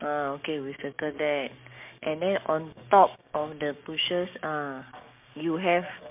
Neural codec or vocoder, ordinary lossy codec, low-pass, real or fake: codec, 16 kHz, 6 kbps, DAC; MP3, 32 kbps; 3.6 kHz; fake